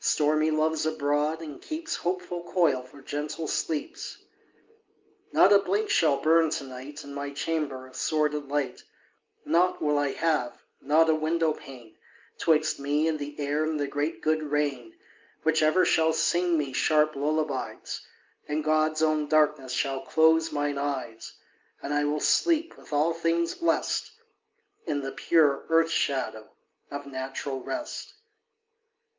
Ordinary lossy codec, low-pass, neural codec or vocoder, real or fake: Opus, 24 kbps; 7.2 kHz; none; real